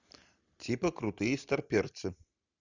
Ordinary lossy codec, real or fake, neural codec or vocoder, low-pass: Opus, 64 kbps; real; none; 7.2 kHz